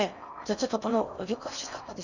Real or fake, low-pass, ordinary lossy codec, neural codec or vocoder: fake; 7.2 kHz; none; codec, 16 kHz in and 24 kHz out, 0.8 kbps, FocalCodec, streaming, 65536 codes